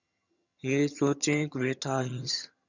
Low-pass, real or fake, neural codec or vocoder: 7.2 kHz; fake; vocoder, 22.05 kHz, 80 mel bands, HiFi-GAN